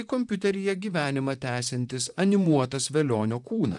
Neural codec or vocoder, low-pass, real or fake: vocoder, 44.1 kHz, 128 mel bands, Pupu-Vocoder; 10.8 kHz; fake